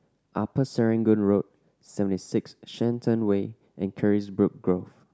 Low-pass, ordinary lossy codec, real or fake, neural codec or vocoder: none; none; real; none